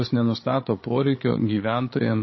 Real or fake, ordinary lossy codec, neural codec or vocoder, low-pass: fake; MP3, 24 kbps; codec, 16 kHz, 16 kbps, FreqCodec, larger model; 7.2 kHz